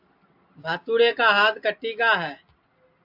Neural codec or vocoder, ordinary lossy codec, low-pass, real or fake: none; AAC, 48 kbps; 5.4 kHz; real